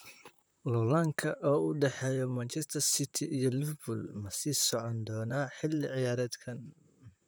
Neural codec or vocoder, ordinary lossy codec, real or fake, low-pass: vocoder, 44.1 kHz, 128 mel bands, Pupu-Vocoder; none; fake; none